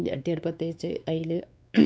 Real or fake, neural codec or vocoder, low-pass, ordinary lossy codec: fake; codec, 16 kHz, 4 kbps, X-Codec, WavLM features, trained on Multilingual LibriSpeech; none; none